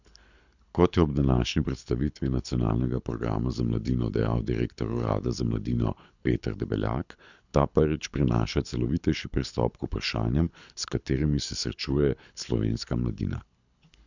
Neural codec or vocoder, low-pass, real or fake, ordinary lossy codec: codec, 24 kHz, 6 kbps, HILCodec; 7.2 kHz; fake; none